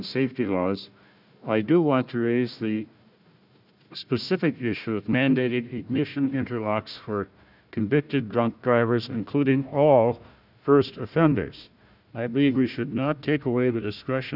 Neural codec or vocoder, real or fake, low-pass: codec, 16 kHz, 1 kbps, FunCodec, trained on Chinese and English, 50 frames a second; fake; 5.4 kHz